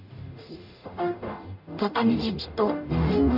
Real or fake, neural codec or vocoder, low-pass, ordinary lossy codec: fake; codec, 44.1 kHz, 0.9 kbps, DAC; 5.4 kHz; none